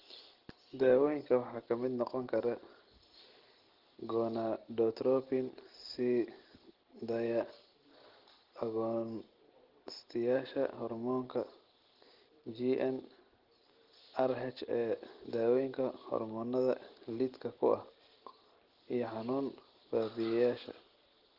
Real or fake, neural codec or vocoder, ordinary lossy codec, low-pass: real; none; Opus, 16 kbps; 5.4 kHz